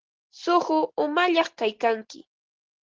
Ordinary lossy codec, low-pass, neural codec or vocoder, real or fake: Opus, 16 kbps; 7.2 kHz; none; real